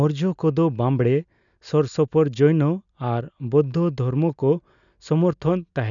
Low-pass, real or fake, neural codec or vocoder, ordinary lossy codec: 7.2 kHz; real; none; none